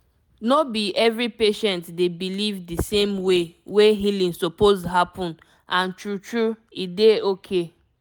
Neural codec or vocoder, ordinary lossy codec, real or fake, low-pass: none; none; real; none